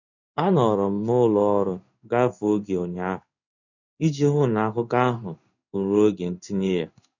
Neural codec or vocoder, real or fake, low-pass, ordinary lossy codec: codec, 16 kHz in and 24 kHz out, 1 kbps, XY-Tokenizer; fake; 7.2 kHz; none